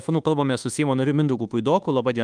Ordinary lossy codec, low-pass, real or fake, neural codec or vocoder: Opus, 32 kbps; 9.9 kHz; fake; codec, 24 kHz, 1.2 kbps, DualCodec